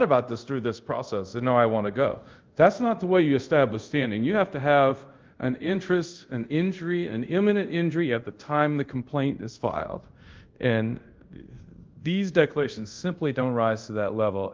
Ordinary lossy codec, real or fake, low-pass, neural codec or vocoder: Opus, 32 kbps; fake; 7.2 kHz; codec, 24 kHz, 0.5 kbps, DualCodec